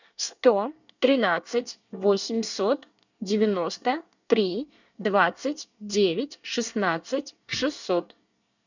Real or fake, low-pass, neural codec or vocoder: fake; 7.2 kHz; codec, 24 kHz, 1 kbps, SNAC